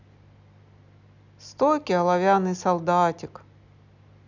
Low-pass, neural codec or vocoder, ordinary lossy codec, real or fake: 7.2 kHz; none; none; real